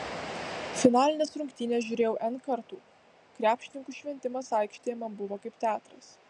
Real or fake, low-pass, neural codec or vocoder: real; 10.8 kHz; none